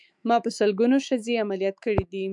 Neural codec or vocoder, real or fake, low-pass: autoencoder, 48 kHz, 128 numbers a frame, DAC-VAE, trained on Japanese speech; fake; 10.8 kHz